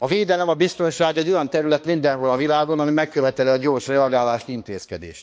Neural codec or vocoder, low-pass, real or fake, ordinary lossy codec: codec, 16 kHz, 2 kbps, X-Codec, HuBERT features, trained on balanced general audio; none; fake; none